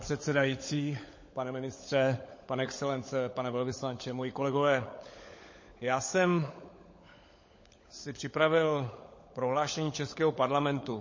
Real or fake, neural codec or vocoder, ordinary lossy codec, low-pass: fake; codec, 16 kHz, 16 kbps, FunCodec, trained on LibriTTS, 50 frames a second; MP3, 32 kbps; 7.2 kHz